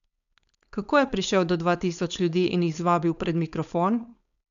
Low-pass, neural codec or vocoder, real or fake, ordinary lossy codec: 7.2 kHz; codec, 16 kHz, 4.8 kbps, FACodec; fake; none